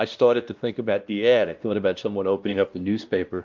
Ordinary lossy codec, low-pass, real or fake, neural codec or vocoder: Opus, 24 kbps; 7.2 kHz; fake; codec, 16 kHz, 1 kbps, X-Codec, WavLM features, trained on Multilingual LibriSpeech